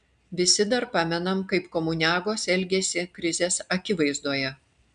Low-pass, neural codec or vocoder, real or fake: 9.9 kHz; none; real